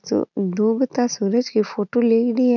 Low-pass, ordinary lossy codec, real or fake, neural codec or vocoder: 7.2 kHz; none; real; none